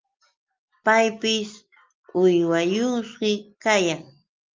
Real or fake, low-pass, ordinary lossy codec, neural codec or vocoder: real; 7.2 kHz; Opus, 24 kbps; none